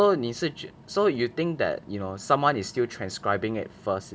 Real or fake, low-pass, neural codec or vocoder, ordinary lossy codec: real; none; none; none